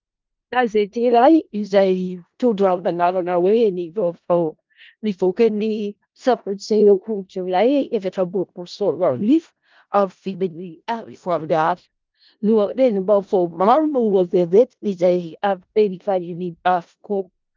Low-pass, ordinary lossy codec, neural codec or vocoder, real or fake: 7.2 kHz; Opus, 24 kbps; codec, 16 kHz in and 24 kHz out, 0.4 kbps, LongCat-Audio-Codec, four codebook decoder; fake